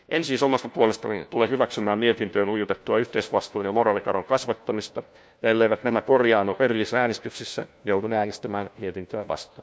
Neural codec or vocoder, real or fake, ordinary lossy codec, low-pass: codec, 16 kHz, 1 kbps, FunCodec, trained on LibriTTS, 50 frames a second; fake; none; none